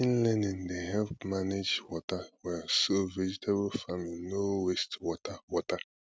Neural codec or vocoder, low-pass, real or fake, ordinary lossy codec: none; none; real; none